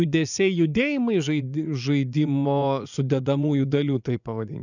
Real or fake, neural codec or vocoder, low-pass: fake; vocoder, 24 kHz, 100 mel bands, Vocos; 7.2 kHz